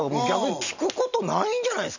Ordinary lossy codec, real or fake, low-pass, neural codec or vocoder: none; real; 7.2 kHz; none